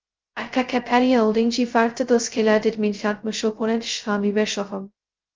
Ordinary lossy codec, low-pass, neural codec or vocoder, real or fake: Opus, 24 kbps; 7.2 kHz; codec, 16 kHz, 0.2 kbps, FocalCodec; fake